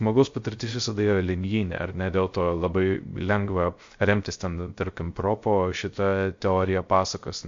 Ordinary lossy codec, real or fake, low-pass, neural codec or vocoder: MP3, 48 kbps; fake; 7.2 kHz; codec, 16 kHz, 0.3 kbps, FocalCodec